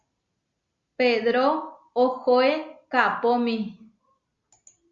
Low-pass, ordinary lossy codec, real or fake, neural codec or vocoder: 7.2 kHz; Opus, 64 kbps; real; none